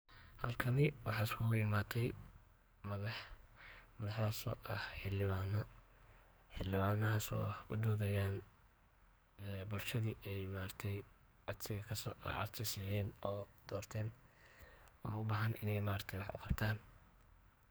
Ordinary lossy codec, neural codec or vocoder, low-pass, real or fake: none; codec, 44.1 kHz, 2.6 kbps, SNAC; none; fake